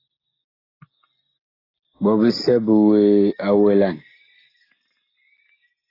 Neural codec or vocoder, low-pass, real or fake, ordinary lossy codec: none; 5.4 kHz; real; AAC, 24 kbps